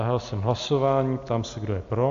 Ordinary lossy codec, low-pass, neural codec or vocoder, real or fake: AAC, 64 kbps; 7.2 kHz; none; real